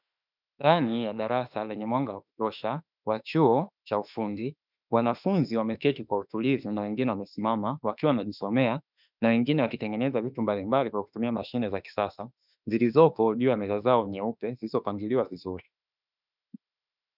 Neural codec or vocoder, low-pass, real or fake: autoencoder, 48 kHz, 32 numbers a frame, DAC-VAE, trained on Japanese speech; 5.4 kHz; fake